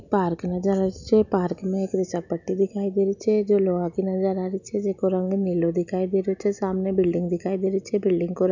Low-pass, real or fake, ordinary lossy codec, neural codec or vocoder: 7.2 kHz; real; none; none